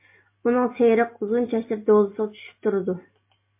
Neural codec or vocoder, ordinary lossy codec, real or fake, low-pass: none; MP3, 24 kbps; real; 3.6 kHz